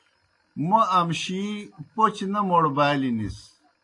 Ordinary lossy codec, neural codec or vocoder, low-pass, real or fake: AAC, 48 kbps; none; 10.8 kHz; real